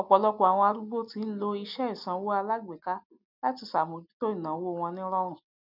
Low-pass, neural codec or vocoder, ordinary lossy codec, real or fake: 5.4 kHz; none; none; real